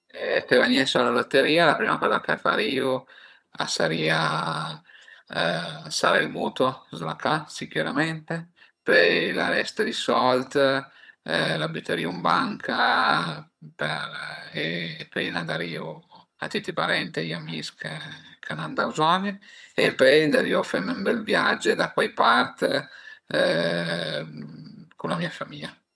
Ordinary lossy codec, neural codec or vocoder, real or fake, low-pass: none; vocoder, 22.05 kHz, 80 mel bands, HiFi-GAN; fake; none